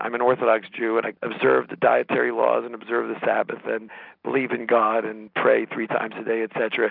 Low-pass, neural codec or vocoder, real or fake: 5.4 kHz; none; real